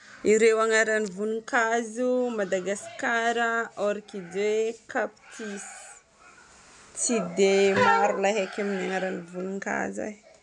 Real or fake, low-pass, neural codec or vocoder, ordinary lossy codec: real; 10.8 kHz; none; none